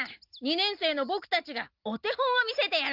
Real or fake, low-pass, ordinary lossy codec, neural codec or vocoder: real; 5.4 kHz; Opus, 32 kbps; none